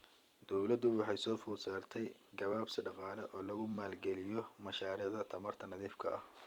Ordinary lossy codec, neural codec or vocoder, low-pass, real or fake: Opus, 64 kbps; vocoder, 44.1 kHz, 128 mel bands every 512 samples, BigVGAN v2; 19.8 kHz; fake